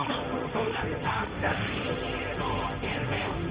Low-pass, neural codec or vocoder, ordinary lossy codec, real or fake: 3.6 kHz; codec, 16 kHz, 1.1 kbps, Voila-Tokenizer; Opus, 32 kbps; fake